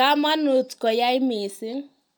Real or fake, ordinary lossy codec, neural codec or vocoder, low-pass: real; none; none; none